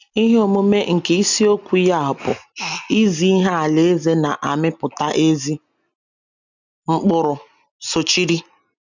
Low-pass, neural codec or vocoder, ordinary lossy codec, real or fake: 7.2 kHz; none; none; real